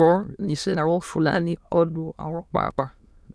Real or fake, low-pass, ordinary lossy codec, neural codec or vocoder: fake; none; none; autoencoder, 22.05 kHz, a latent of 192 numbers a frame, VITS, trained on many speakers